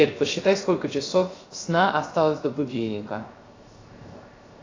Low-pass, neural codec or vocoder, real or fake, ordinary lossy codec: 7.2 kHz; codec, 16 kHz, 0.3 kbps, FocalCodec; fake; AAC, 32 kbps